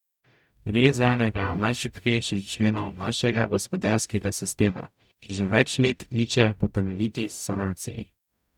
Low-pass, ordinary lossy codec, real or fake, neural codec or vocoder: 19.8 kHz; none; fake; codec, 44.1 kHz, 0.9 kbps, DAC